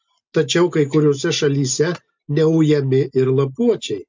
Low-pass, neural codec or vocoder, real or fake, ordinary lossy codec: 7.2 kHz; none; real; MP3, 64 kbps